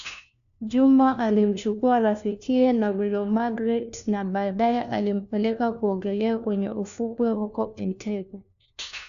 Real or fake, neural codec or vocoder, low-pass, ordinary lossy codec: fake; codec, 16 kHz, 1 kbps, FunCodec, trained on LibriTTS, 50 frames a second; 7.2 kHz; none